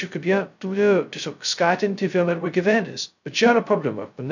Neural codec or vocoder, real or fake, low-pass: codec, 16 kHz, 0.2 kbps, FocalCodec; fake; 7.2 kHz